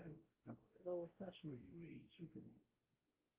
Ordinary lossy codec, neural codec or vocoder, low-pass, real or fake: Opus, 16 kbps; codec, 16 kHz, 0.5 kbps, X-Codec, WavLM features, trained on Multilingual LibriSpeech; 3.6 kHz; fake